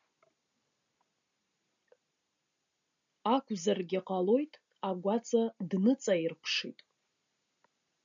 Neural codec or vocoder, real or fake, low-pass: none; real; 7.2 kHz